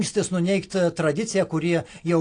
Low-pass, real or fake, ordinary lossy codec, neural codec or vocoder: 9.9 kHz; real; AAC, 48 kbps; none